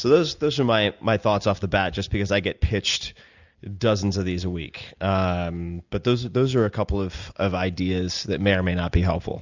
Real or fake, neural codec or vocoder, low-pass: real; none; 7.2 kHz